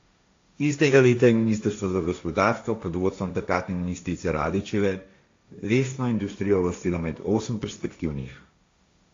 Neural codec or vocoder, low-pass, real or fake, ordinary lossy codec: codec, 16 kHz, 1.1 kbps, Voila-Tokenizer; 7.2 kHz; fake; AAC, 48 kbps